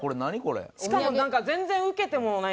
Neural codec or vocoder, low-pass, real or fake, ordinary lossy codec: none; none; real; none